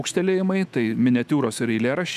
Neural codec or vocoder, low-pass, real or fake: autoencoder, 48 kHz, 128 numbers a frame, DAC-VAE, trained on Japanese speech; 14.4 kHz; fake